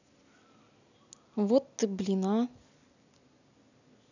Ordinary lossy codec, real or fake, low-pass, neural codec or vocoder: none; real; 7.2 kHz; none